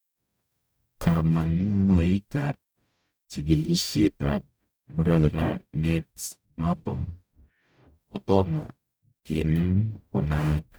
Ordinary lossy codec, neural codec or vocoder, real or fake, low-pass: none; codec, 44.1 kHz, 0.9 kbps, DAC; fake; none